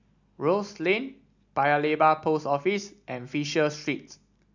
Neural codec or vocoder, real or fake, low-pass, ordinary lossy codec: none; real; 7.2 kHz; none